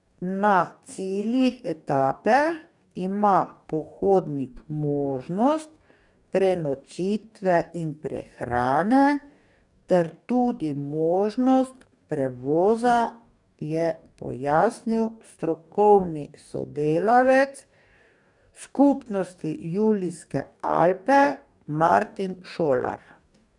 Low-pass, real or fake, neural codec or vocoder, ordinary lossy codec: 10.8 kHz; fake; codec, 44.1 kHz, 2.6 kbps, DAC; none